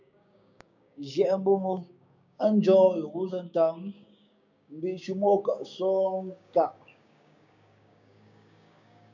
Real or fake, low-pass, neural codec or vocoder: fake; 7.2 kHz; codec, 16 kHz, 6 kbps, DAC